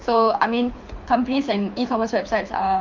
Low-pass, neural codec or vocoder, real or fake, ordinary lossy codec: 7.2 kHz; codec, 24 kHz, 6 kbps, HILCodec; fake; MP3, 48 kbps